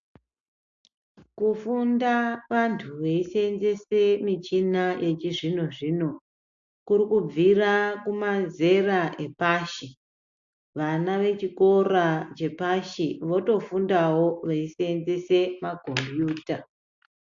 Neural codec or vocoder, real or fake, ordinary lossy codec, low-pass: none; real; AAC, 64 kbps; 7.2 kHz